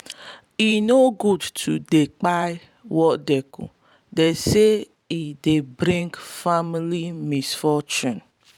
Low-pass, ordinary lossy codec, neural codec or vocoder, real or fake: 19.8 kHz; none; vocoder, 44.1 kHz, 128 mel bands every 512 samples, BigVGAN v2; fake